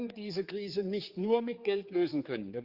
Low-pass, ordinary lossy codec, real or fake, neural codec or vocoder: 5.4 kHz; Opus, 32 kbps; fake; codec, 16 kHz, 4 kbps, X-Codec, HuBERT features, trained on general audio